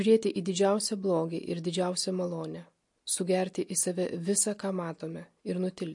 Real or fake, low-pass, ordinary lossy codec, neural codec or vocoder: real; 10.8 kHz; MP3, 48 kbps; none